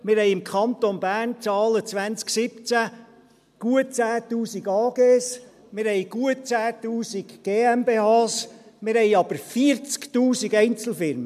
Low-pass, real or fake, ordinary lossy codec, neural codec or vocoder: 14.4 kHz; real; MP3, 96 kbps; none